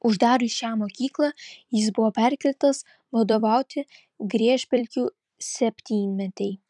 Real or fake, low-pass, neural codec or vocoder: fake; 10.8 kHz; vocoder, 44.1 kHz, 128 mel bands every 256 samples, BigVGAN v2